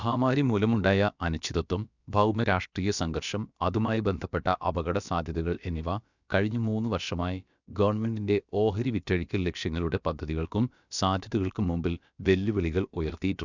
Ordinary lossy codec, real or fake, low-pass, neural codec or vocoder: none; fake; 7.2 kHz; codec, 16 kHz, about 1 kbps, DyCAST, with the encoder's durations